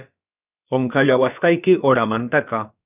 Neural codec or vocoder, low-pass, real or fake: codec, 16 kHz, about 1 kbps, DyCAST, with the encoder's durations; 3.6 kHz; fake